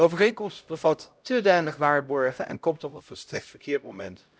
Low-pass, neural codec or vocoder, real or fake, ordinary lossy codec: none; codec, 16 kHz, 0.5 kbps, X-Codec, HuBERT features, trained on LibriSpeech; fake; none